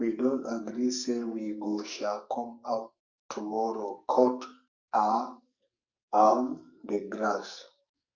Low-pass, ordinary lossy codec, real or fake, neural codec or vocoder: 7.2 kHz; Opus, 64 kbps; fake; codec, 44.1 kHz, 2.6 kbps, SNAC